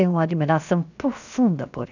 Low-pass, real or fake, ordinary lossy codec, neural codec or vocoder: 7.2 kHz; fake; none; codec, 16 kHz, 0.7 kbps, FocalCodec